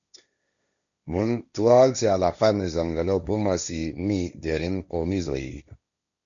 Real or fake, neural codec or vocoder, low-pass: fake; codec, 16 kHz, 1.1 kbps, Voila-Tokenizer; 7.2 kHz